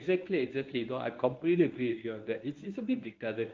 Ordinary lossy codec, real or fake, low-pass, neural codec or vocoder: Opus, 24 kbps; fake; 7.2 kHz; codec, 24 kHz, 0.9 kbps, WavTokenizer, medium speech release version 1